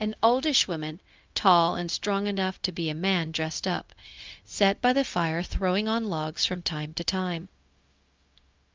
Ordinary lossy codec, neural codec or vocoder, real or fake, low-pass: Opus, 24 kbps; codec, 16 kHz, 0.4 kbps, LongCat-Audio-Codec; fake; 7.2 kHz